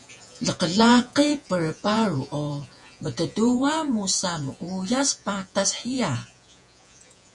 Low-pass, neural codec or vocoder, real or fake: 10.8 kHz; vocoder, 48 kHz, 128 mel bands, Vocos; fake